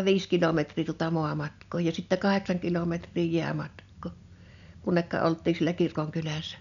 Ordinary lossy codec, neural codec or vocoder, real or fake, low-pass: none; none; real; 7.2 kHz